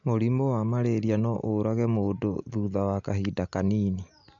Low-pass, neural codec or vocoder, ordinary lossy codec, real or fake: 7.2 kHz; none; MP3, 64 kbps; real